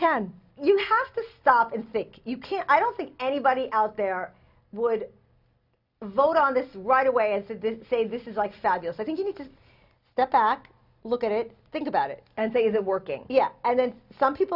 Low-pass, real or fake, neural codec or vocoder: 5.4 kHz; real; none